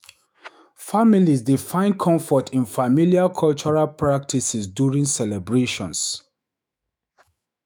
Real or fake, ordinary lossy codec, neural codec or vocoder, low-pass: fake; none; autoencoder, 48 kHz, 128 numbers a frame, DAC-VAE, trained on Japanese speech; none